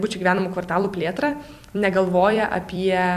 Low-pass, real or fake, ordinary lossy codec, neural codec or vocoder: 14.4 kHz; fake; AAC, 96 kbps; vocoder, 44.1 kHz, 128 mel bands every 256 samples, BigVGAN v2